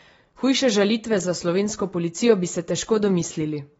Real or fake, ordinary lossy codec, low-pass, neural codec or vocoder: real; AAC, 24 kbps; 10.8 kHz; none